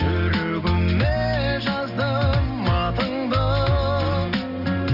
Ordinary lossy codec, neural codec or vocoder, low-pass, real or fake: none; none; 5.4 kHz; real